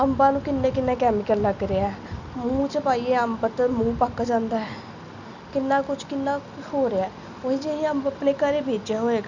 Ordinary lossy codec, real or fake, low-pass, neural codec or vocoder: none; real; 7.2 kHz; none